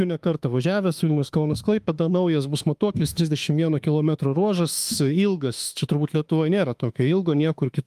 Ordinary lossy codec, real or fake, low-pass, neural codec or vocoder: Opus, 32 kbps; fake; 14.4 kHz; autoencoder, 48 kHz, 32 numbers a frame, DAC-VAE, trained on Japanese speech